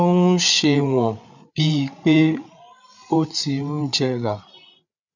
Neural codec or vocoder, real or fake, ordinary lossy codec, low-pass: codec, 16 kHz, 8 kbps, FreqCodec, larger model; fake; none; 7.2 kHz